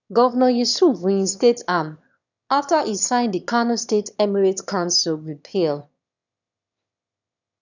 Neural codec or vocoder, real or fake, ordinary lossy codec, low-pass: autoencoder, 22.05 kHz, a latent of 192 numbers a frame, VITS, trained on one speaker; fake; none; 7.2 kHz